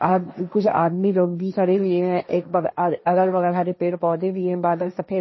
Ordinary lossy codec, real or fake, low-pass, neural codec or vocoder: MP3, 24 kbps; fake; 7.2 kHz; codec, 16 kHz, 1.1 kbps, Voila-Tokenizer